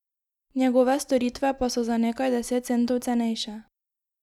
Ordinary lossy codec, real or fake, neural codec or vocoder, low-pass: none; real; none; 19.8 kHz